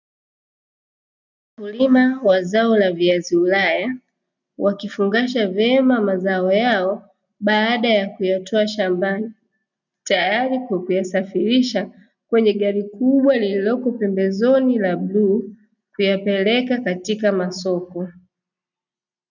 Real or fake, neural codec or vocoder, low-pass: real; none; 7.2 kHz